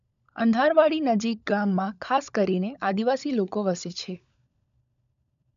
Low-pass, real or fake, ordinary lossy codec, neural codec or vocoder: 7.2 kHz; fake; none; codec, 16 kHz, 16 kbps, FunCodec, trained on LibriTTS, 50 frames a second